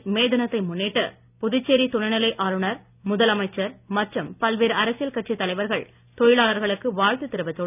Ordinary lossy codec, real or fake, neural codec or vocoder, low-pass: none; real; none; 3.6 kHz